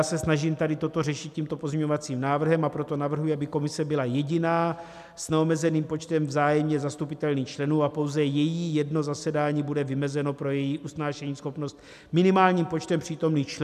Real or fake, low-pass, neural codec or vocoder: real; 14.4 kHz; none